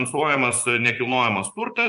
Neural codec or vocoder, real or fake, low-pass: vocoder, 24 kHz, 100 mel bands, Vocos; fake; 10.8 kHz